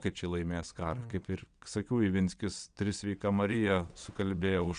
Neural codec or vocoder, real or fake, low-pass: vocoder, 22.05 kHz, 80 mel bands, WaveNeXt; fake; 9.9 kHz